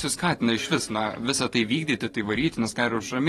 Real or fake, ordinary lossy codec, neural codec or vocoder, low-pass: fake; AAC, 32 kbps; vocoder, 44.1 kHz, 128 mel bands every 512 samples, BigVGAN v2; 19.8 kHz